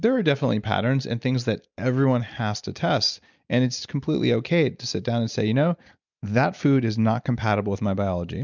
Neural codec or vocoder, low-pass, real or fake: none; 7.2 kHz; real